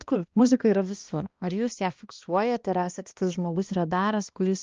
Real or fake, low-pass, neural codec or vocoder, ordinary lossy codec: fake; 7.2 kHz; codec, 16 kHz, 1 kbps, X-Codec, HuBERT features, trained on balanced general audio; Opus, 24 kbps